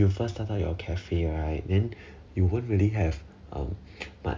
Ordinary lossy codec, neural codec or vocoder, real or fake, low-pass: none; none; real; none